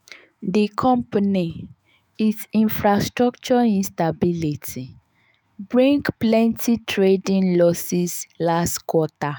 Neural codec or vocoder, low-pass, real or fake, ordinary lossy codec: autoencoder, 48 kHz, 128 numbers a frame, DAC-VAE, trained on Japanese speech; none; fake; none